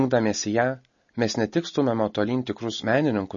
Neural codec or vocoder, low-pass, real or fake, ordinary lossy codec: none; 7.2 kHz; real; MP3, 32 kbps